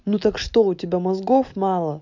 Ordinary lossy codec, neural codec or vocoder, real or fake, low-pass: none; none; real; 7.2 kHz